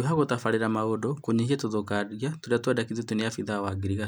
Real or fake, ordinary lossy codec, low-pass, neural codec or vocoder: real; none; none; none